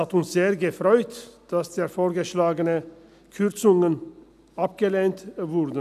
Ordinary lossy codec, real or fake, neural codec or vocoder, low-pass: none; real; none; 14.4 kHz